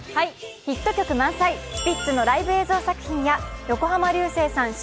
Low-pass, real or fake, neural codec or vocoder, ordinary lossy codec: none; real; none; none